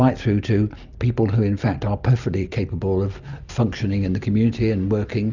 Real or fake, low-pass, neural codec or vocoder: real; 7.2 kHz; none